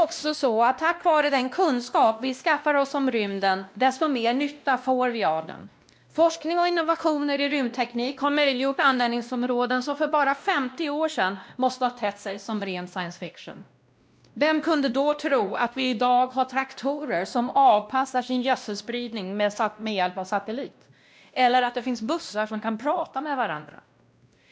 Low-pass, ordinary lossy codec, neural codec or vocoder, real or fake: none; none; codec, 16 kHz, 1 kbps, X-Codec, WavLM features, trained on Multilingual LibriSpeech; fake